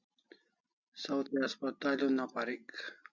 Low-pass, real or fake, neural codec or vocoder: 7.2 kHz; real; none